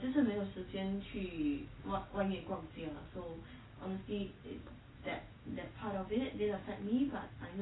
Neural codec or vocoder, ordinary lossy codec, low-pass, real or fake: none; AAC, 16 kbps; 7.2 kHz; real